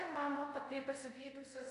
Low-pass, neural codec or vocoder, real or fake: 10.8 kHz; codec, 24 kHz, 0.5 kbps, DualCodec; fake